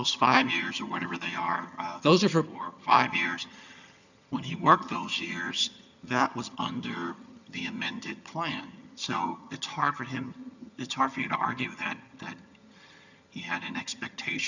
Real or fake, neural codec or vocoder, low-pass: fake; vocoder, 22.05 kHz, 80 mel bands, HiFi-GAN; 7.2 kHz